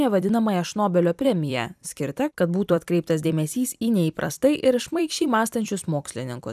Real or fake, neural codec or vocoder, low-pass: fake; vocoder, 44.1 kHz, 128 mel bands every 256 samples, BigVGAN v2; 14.4 kHz